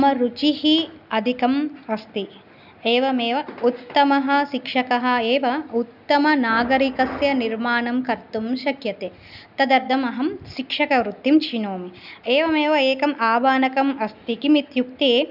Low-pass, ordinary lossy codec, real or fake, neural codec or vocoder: 5.4 kHz; none; real; none